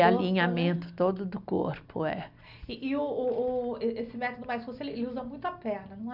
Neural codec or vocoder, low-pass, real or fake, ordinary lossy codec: none; 5.4 kHz; real; none